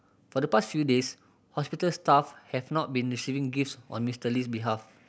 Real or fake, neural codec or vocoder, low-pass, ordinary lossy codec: real; none; none; none